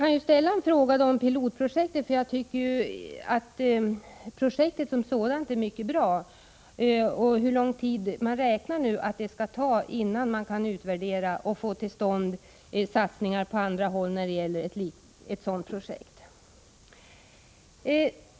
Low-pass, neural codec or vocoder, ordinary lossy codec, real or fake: none; none; none; real